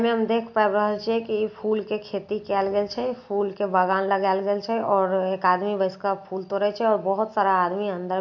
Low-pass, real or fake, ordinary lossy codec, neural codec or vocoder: 7.2 kHz; real; MP3, 48 kbps; none